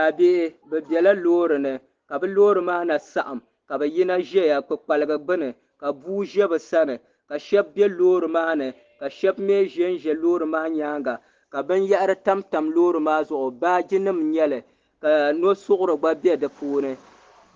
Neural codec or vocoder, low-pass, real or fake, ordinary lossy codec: none; 7.2 kHz; real; Opus, 16 kbps